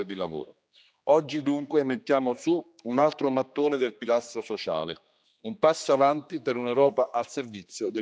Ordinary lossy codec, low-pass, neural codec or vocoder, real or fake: none; none; codec, 16 kHz, 2 kbps, X-Codec, HuBERT features, trained on general audio; fake